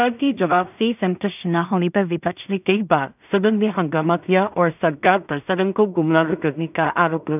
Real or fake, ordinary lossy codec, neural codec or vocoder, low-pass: fake; none; codec, 16 kHz in and 24 kHz out, 0.4 kbps, LongCat-Audio-Codec, two codebook decoder; 3.6 kHz